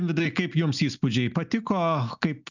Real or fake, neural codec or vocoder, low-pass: real; none; 7.2 kHz